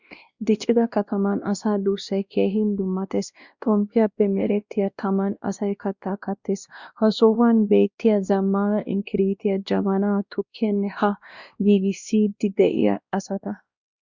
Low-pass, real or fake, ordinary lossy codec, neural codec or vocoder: 7.2 kHz; fake; Opus, 64 kbps; codec, 16 kHz, 1 kbps, X-Codec, WavLM features, trained on Multilingual LibriSpeech